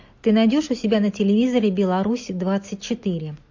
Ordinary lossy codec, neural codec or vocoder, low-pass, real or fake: MP3, 48 kbps; none; 7.2 kHz; real